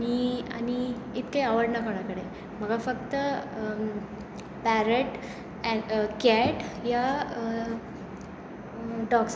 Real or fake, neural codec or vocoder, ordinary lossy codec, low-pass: real; none; none; none